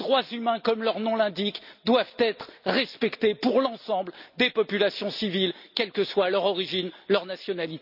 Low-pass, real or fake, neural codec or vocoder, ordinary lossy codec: 5.4 kHz; real; none; none